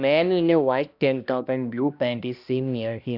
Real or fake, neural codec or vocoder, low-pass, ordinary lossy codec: fake; codec, 16 kHz, 1 kbps, X-Codec, HuBERT features, trained on balanced general audio; 5.4 kHz; none